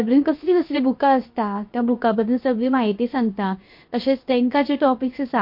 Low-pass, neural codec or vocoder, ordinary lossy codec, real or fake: 5.4 kHz; codec, 16 kHz, 0.7 kbps, FocalCodec; MP3, 32 kbps; fake